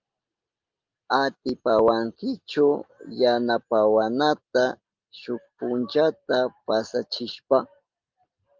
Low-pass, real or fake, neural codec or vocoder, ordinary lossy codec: 7.2 kHz; real; none; Opus, 24 kbps